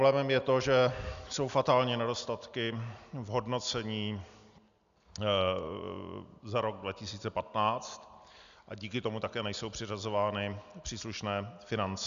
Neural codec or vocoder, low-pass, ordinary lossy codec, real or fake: none; 7.2 kHz; AAC, 96 kbps; real